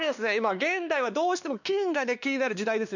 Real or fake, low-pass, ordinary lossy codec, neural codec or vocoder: fake; 7.2 kHz; none; codec, 16 kHz, 2 kbps, X-Codec, WavLM features, trained on Multilingual LibriSpeech